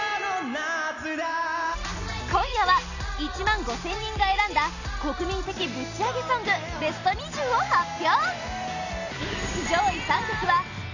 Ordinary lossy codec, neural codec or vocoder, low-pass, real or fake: none; none; 7.2 kHz; real